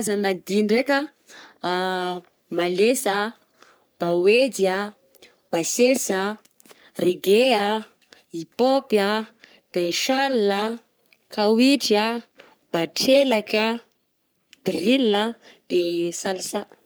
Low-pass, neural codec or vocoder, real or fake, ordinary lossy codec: none; codec, 44.1 kHz, 3.4 kbps, Pupu-Codec; fake; none